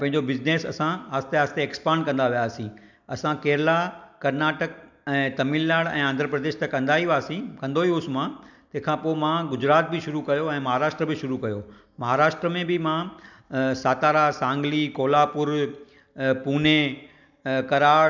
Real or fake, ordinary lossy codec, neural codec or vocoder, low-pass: real; none; none; 7.2 kHz